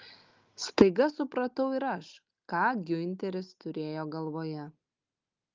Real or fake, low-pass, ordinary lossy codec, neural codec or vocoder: real; 7.2 kHz; Opus, 32 kbps; none